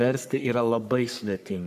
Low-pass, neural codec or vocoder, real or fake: 14.4 kHz; codec, 44.1 kHz, 3.4 kbps, Pupu-Codec; fake